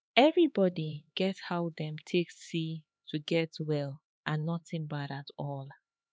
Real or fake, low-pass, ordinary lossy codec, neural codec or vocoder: fake; none; none; codec, 16 kHz, 4 kbps, X-Codec, HuBERT features, trained on LibriSpeech